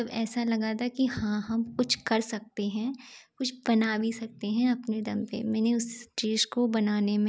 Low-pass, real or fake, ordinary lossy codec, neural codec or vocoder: none; real; none; none